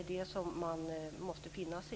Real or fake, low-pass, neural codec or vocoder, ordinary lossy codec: real; none; none; none